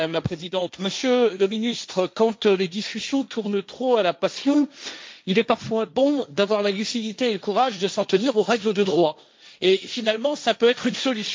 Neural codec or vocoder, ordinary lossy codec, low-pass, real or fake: codec, 16 kHz, 1.1 kbps, Voila-Tokenizer; none; none; fake